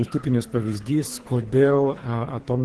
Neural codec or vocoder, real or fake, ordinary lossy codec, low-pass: codec, 24 kHz, 3 kbps, HILCodec; fake; Opus, 16 kbps; 10.8 kHz